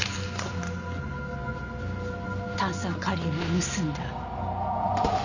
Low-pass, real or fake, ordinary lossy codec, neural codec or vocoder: 7.2 kHz; real; none; none